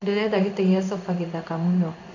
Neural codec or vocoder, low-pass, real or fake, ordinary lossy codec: codec, 16 kHz in and 24 kHz out, 1 kbps, XY-Tokenizer; 7.2 kHz; fake; none